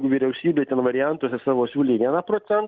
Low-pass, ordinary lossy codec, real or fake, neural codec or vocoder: 7.2 kHz; Opus, 32 kbps; real; none